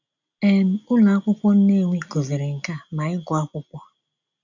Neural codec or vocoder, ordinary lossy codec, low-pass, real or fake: none; none; 7.2 kHz; real